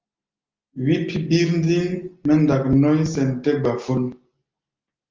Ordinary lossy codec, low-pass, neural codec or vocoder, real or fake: Opus, 32 kbps; 7.2 kHz; none; real